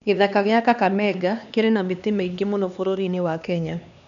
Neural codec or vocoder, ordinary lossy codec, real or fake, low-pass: codec, 16 kHz, 2 kbps, X-Codec, HuBERT features, trained on LibriSpeech; none; fake; 7.2 kHz